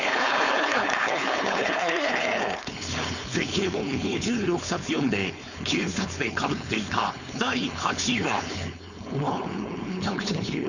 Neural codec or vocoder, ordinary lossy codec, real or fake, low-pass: codec, 16 kHz, 4.8 kbps, FACodec; none; fake; 7.2 kHz